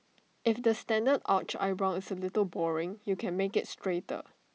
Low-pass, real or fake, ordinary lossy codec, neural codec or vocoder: none; real; none; none